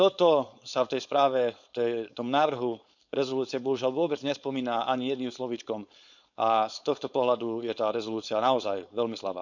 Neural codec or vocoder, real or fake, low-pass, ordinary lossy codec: codec, 16 kHz, 4.8 kbps, FACodec; fake; 7.2 kHz; none